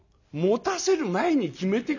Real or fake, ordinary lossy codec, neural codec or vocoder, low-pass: real; none; none; 7.2 kHz